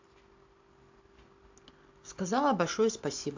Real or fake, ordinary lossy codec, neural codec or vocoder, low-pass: real; AAC, 48 kbps; none; 7.2 kHz